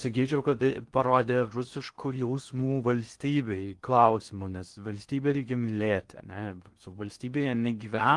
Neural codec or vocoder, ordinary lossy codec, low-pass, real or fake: codec, 16 kHz in and 24 kHz out, 0.6 kbps, FocalCodec, streaming, 4096 codes; Opus, 24 kbps; 10.8 kHz; fake